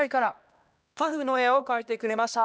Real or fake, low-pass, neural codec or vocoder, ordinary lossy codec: fake; none; codec, 16 kHz, 1 kbps, X-Codec, HuBERT features, trained on LibriSpeech; none